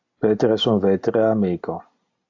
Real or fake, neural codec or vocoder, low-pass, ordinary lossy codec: real; none; 7.2 kHz; AAC, 48 kbps